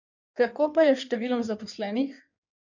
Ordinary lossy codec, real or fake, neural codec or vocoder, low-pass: none; fake; codec, 16 kHz in and 24 kHz out, 1.1 kbps, FireRedTTS-2 codec; 7.2 kHz